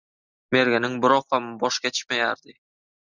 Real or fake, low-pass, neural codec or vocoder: real; 7.2 kHz; none